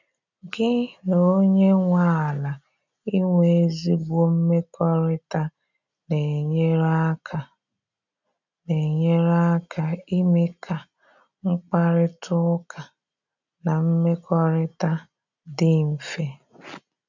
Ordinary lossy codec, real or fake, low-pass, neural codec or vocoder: none; real; 7.2 kHz; none